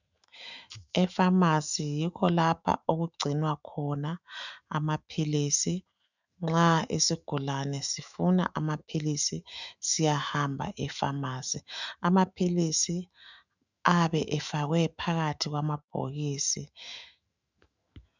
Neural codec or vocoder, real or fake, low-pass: autoencoder, 48 kHz, 128 numbers a frame, DAC-VAE, trained on Japanese speech; fake; 7.2 kHz